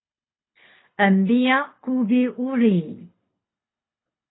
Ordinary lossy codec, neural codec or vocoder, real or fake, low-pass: AAC, 16 kbps; codec, 24 kHz, 6 kbps, HILCodec; fake; 7.2 kHz